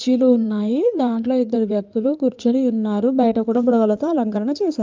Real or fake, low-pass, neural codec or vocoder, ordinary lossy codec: fake; 7.2 kHz; codec, 16 kHz in and 24 kHz out, 2.2 kbps, FireRedTTS-2 codec; Opus, 24 kbps